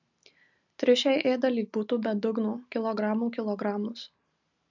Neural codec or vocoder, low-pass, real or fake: none; 7.2 kHz; real